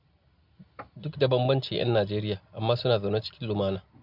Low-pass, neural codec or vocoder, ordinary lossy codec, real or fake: 5.4 kHz; none; none; real